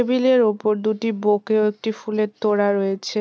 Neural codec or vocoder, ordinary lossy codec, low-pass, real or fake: none; none; none; real